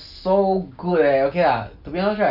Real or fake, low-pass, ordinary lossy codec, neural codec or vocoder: real; 5.4 kHz; none; none